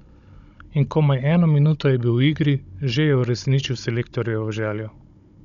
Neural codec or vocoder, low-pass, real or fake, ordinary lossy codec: codec, 16 kHz, 16 kbps, FunCodec, trained on Chinese and English, 50 frames a second; 7.2 kHz; fake; none